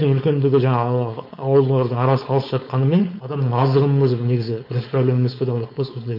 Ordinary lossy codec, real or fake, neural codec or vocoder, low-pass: MP3, 32 kbps; fake; codec, 16 kHz, 4.8 kbps, FACodec; 5.4 kHz